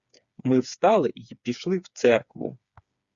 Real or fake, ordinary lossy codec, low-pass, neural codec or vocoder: fake; Opus, 64 kbps; 7.2 kHz; codec, 16 kHz, 4 kbps, FreqCodec, smaller model